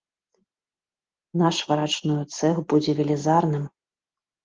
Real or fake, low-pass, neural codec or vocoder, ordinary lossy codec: real; 7.2 kHz; none; Opus, 16 kbps